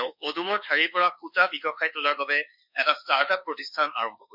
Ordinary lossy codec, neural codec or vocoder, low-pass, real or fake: none; codec, 24 kHz, 1.2 kbps, DualCodec; 5.4 kHz; fake